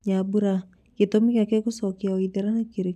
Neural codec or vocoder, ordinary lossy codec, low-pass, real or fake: none; none; 14.4 kHz; real